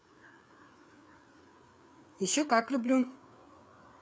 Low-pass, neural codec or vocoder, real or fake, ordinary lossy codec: none; codec, 16 kHz, 4 kbps, FreqCodec, larger model; fake; none